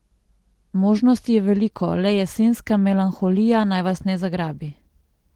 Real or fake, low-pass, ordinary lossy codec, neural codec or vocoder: real; 19.8 kHz; Opus, 16 kbps; none